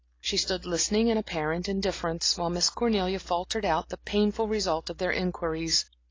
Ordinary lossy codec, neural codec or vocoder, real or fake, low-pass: AAC, 32 kbps; none; real; 7.2 kHz